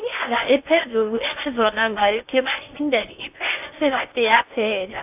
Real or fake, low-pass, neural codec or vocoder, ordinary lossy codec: fake; 3.6 kHz; codec, 16 kHz in and 24 kHz out, 0.6 kbps, FocalCodec, streaming, 2048 codes; AAC, 32 kbps